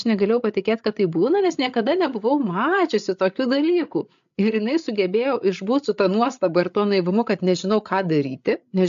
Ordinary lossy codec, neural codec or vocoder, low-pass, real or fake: AAC, 64 kbps; codec, 16 kHz, 8 kbps, FreqCodec, larger model; 7.2 kHz; fake